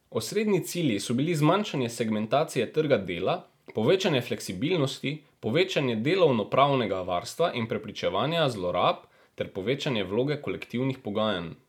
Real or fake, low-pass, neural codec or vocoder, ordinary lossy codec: real; 19.8 kHz; none; none